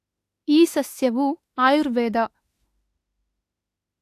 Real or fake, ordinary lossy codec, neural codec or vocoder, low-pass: fake; AAC, 64 kbps; autoencoder, 48 kHz, 32 numbers a frame, DAC-VAE, trained on Japanese speech; 14.4 kHz